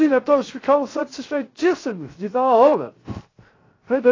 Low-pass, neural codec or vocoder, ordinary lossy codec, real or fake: 7.2 kHz; codec, 16 kHz, 0.3 kbps, FocalCodec; AAC, 32 kbps; fake